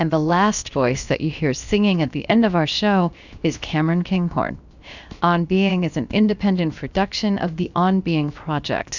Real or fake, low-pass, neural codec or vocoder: fake; 7.2 kHz; codec, 16 kHz, 0.7 kbps, FocalCodec